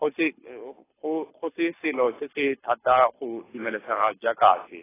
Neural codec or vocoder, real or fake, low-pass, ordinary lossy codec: codec, 24 kHz, 6 kbps, HILCodec; fake; 3.6 kHz; AAC, 16 kbps